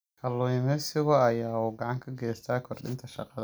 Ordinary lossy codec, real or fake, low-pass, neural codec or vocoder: none; real; none; none